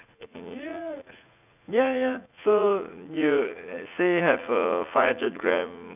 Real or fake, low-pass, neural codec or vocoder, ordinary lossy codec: fake; 3.6 kHz; vocoder, 44.1 kHz, 80 mel bands, Vocos; none